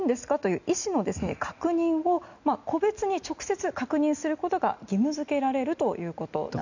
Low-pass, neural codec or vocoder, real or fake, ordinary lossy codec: 7.2 kHz; none; real; none